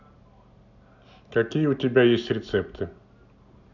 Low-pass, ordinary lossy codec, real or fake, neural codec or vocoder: 7.2 kHz; none; real; none